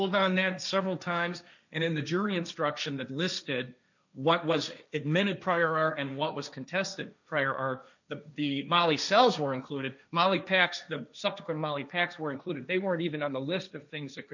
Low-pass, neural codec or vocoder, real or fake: 7.2 kHz; codec, 16 kHz, 1.1 kbps, Voila-Tokenizer; fake